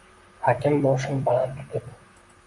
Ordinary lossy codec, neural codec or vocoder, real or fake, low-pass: AAC, 64 kbps; vocoder, 44.1 kHz, 128 mel bands, Pupu-Vocoder; fake; 10.8 kHz